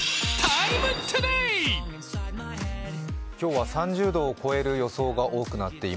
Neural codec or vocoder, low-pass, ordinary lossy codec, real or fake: none; none; none; real